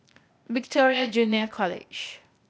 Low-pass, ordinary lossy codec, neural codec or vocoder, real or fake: none; none; codec, 16 kHz, 0.8 kbps, ZipCodec; fake